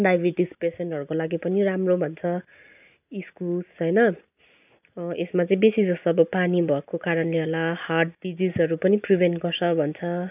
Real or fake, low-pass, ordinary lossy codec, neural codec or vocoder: real; 3.6 kHz; none; none